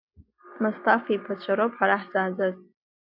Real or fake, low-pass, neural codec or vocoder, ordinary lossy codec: real; 5.4 kHz; none; AAC, 48 kbps